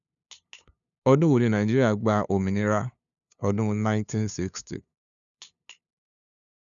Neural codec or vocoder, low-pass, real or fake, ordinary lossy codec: codec, 16 kHz, 2 kbps, FunCodec, trained on LibriTTS, 25 frames a second; 7.2 kHz; fake; none